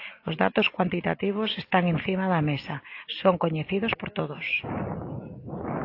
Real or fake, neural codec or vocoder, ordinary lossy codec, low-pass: real; none; AAC, 32 kbps; 5.4 kHz